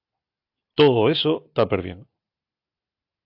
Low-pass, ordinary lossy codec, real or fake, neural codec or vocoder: 5.4 kHz; AAC, 32 kbps; real; none